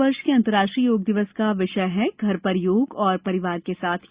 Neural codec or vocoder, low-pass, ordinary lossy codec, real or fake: none; 3.6 kHz; Opus, 64 kbps; real